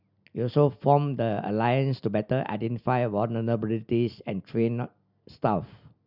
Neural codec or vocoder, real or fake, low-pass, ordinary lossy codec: none; real; 5.4 kHz; none